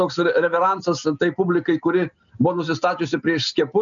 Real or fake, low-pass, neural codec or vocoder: real; 7.2 kHz; none